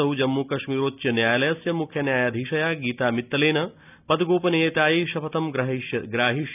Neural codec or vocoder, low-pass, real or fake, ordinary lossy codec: none; 3.6 kHz; real; none